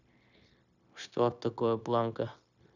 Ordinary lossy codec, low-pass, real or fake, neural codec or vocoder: none; 7.2 kHz; fake; codec, 16 kHz, 0.9 kbps, LongCat-Audio-Codec